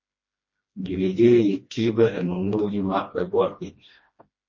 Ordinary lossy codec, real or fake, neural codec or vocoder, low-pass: MP3, 32 kbps; fake; codec, 16 kHz, 1 kbps, FreqCodec, smaller model; 7.2 kHz